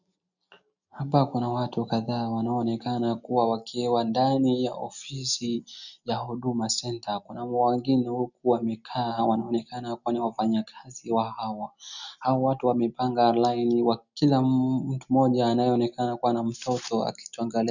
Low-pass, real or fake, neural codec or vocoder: 7.2 kHz; real; none